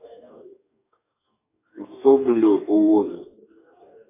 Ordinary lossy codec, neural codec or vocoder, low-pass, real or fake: AAC, 24 kbps; codec, 44.1 kHz, 2.6 kbps, DAC; 3.6 kHz; fake